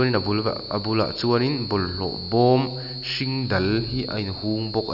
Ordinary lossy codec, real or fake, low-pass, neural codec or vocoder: none; real; 5.4 kHz; none